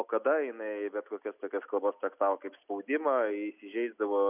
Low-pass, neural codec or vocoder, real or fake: 3.6 kHz; none; real